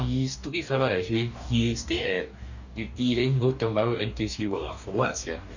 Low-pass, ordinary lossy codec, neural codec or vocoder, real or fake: 7.2 kHz; none; codec, 44.1 kHz, 2.6 kbps, DAC; fake